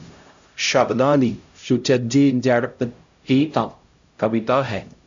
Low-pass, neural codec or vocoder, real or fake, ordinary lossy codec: 7.2 kHz; codec, 16 kHz, 0.5 kbps, X-Codec, HuBERT features, trained on LibriSpeech; fake; MP3, 64 kbps